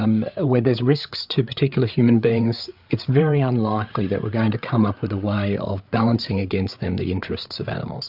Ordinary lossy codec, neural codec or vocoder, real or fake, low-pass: AAC, 48 kbps; codec, 16 kHz, 8 kbps, FreqCodec, larger model; fake; 5.4 kHz